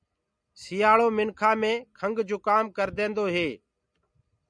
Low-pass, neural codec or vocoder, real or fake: 9.9 kHz; none; real